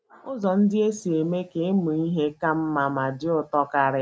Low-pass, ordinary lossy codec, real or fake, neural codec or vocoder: none; none; real; none